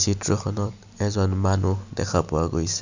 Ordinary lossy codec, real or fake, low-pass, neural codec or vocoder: none; real; 7.2 kHz; none